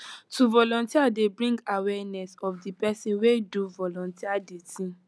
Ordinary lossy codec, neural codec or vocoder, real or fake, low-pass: none; none; real; none